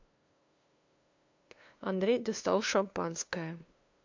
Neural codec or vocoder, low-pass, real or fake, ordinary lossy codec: codec, 16 kHz, 2 kbps, FunCodec, trained on LibriTTS, 25 frames a second; 7.2 kHz; fake; MP3, 48 kbps